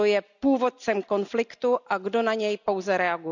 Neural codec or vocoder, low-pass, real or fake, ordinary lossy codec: none; 7.2 kHz; real; none